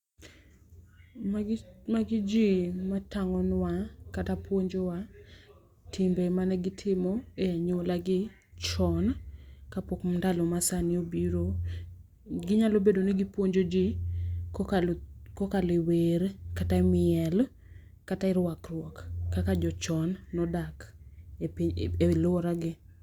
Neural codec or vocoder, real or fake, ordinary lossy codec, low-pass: none; real; Opus, 64 kbps; 19.8 kHz